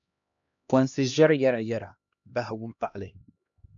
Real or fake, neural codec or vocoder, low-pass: fake; codec, 16 kHz, 1 kbps, X-Codec, HuBERT features, trained on LibriSpeech; 7.2 kHz